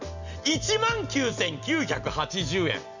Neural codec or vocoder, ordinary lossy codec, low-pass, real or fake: none; none; 7.2 kHz; real